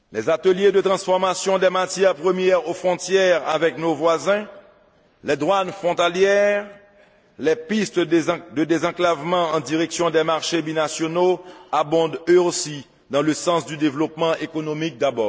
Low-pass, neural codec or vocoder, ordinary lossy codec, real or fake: none; none; none; real